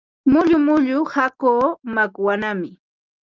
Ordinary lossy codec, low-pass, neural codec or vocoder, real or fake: Opus, 32 kbps; 7.2 kHz; none; real